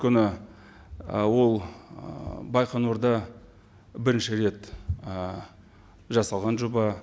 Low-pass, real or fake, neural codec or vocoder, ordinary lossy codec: none; real; none; none